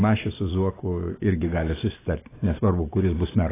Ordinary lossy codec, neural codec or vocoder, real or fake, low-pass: AAC, 16 kbps; none; real; 3.6 kHz